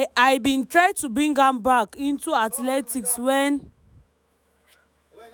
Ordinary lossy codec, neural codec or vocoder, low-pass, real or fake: none; autoencoder, 48 kHz, 128 numbers a frame, DAC-VAE, trained on Japanese speech; none; fake